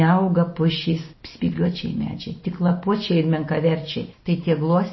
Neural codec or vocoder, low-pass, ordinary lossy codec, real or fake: none; 7.2 kHz; MP3, 24 kbps; real